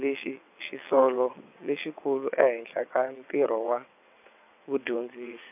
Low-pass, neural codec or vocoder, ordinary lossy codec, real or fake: 3.6 kHz; vocoder, 22.05 kHz, 80 mel bands, WaveNeXt; none; fake